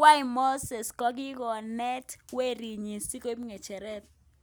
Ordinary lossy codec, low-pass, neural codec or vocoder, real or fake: none; none; none; real